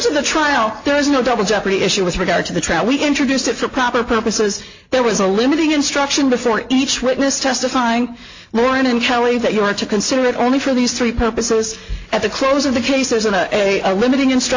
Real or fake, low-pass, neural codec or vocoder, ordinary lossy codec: real; 7.2 kHz; none; AAC, 48 kbps